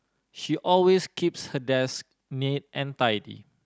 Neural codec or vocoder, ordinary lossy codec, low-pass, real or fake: none; none; none; real